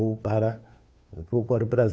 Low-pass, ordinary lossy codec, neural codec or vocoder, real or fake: none; none; codec, 16 kHz, 2 kbps, FunCodec, trained on Chinese and English, 25 frames a second; fake